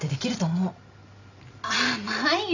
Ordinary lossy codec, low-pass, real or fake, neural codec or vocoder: none; 7.2 kHz; real; none